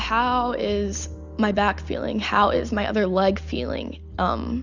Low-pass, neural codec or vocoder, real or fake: 7.2 kHz; none; real